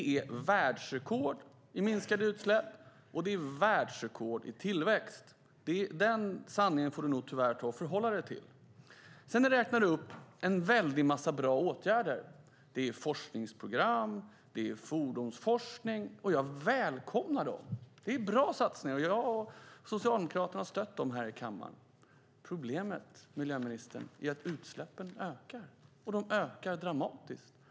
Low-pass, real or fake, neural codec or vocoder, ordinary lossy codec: none; real; none; none